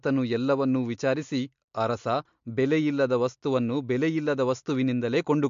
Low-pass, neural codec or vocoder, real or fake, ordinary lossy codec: 7.2 kHz; none; real; MP3, 48 kbps